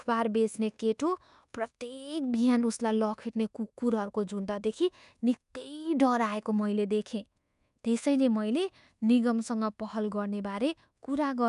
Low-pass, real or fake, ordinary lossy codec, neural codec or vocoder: 10.8 kHz; fake; none; codec, 24 kHz, 1.2 kbps, DualCodec